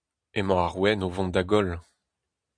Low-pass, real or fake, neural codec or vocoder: 9.9 kHz; real; none